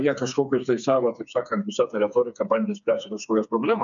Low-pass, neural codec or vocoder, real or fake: 7.2 kHz; codec, 16 kHz, 4 kbps, FreqCodec, smaller model; fake